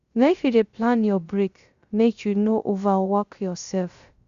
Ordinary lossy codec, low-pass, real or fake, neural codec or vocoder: Opus, 64 kbps; 7.2 kHz; fake; codec, 16 kHz, 0.3 kbps, FocalCodec